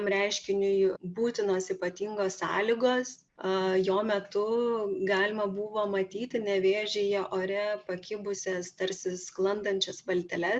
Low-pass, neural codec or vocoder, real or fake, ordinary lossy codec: 9.9 kHz; none; real; Opus, 64 kbps